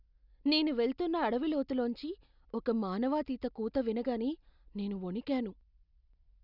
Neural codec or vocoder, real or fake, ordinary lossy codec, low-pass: none; real; none; 5.4 kHz